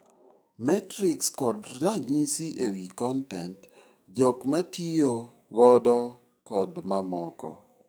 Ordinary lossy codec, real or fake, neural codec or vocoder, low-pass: none; fake; codec, 44.1 kHz, 2.6 kbps, SNAC; none